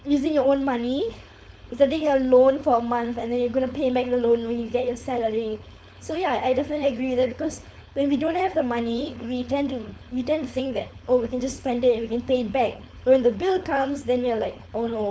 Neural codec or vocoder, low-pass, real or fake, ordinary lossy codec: codec, 16 kHz, 4.8 kbps, FACodec; none; fake; none